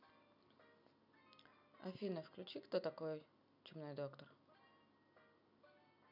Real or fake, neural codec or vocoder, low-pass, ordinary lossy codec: real; none; 5.4 kHz; none